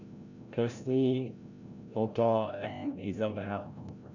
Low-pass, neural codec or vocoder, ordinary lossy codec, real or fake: 7.2 kHz; codec, 16 kHz, 0.5 kbps, FreqCodec, larger model; none; fake